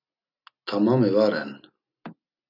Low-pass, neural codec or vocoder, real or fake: 5.4 kHz; none; real